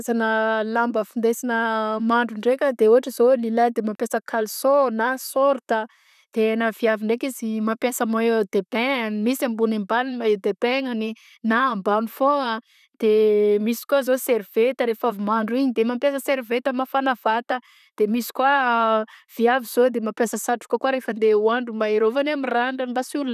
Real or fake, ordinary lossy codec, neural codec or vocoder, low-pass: fake; none; vocoder, 44.1 kHz, 128 mel bands, Pupu-Vocoder; 14.4 kHz